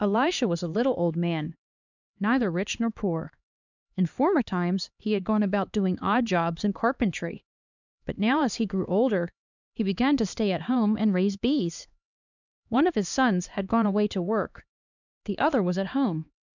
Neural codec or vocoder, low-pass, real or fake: codec, 16 kHz, 2 kbps, X-Codec, HuBERT features, trained on LibriSpeech; 7.2 kHz; fake